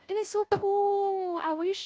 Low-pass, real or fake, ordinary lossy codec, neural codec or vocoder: none; fake; none; codec, 16 kHz, 0.5 kbps, FunCodec, trained on Chinese and English, 25 frames a second